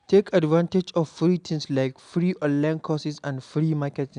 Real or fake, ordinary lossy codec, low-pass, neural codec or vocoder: real; none; 9.9 kHz; none